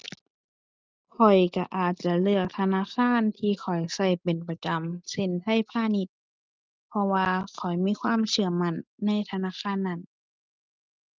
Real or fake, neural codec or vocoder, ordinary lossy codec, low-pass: real; none; Opus, 32 kbps; 7.2 kHz